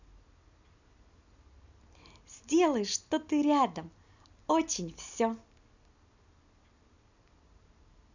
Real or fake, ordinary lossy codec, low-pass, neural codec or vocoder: real; none; 7.2 kHz; none